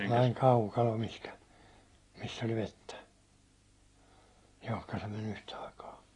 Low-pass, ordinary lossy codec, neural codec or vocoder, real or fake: 10.8 kHz; AAC, 32 kbps; none; real